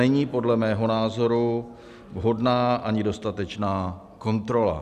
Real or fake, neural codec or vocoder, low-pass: real; none; 14.4 kHz